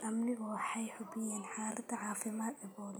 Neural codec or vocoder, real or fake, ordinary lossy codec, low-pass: none; real; none; none